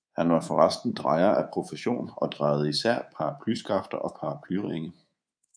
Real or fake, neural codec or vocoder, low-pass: fake; codec, 24 kHz, 3.1 kbps, DualCodec; 9.9 kHz